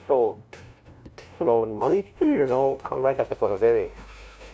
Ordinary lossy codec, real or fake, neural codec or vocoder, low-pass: none; fake; codec, 16 kHz, 0.5 kbps, FunCodec, trained on LibriTTS, 25 frames a second; none